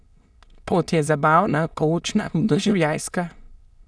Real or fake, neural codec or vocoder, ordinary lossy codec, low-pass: fake; autoencoder, 22.05 kHz, a latent of 192 numbers a frame, VITS, trained on many speakers; none; none